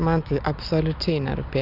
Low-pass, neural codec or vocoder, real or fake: 5.4 kHz; none; real